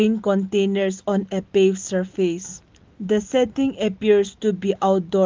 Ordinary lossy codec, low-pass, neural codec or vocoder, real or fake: Opus, 32 kbps; 7.2 kHz; none; real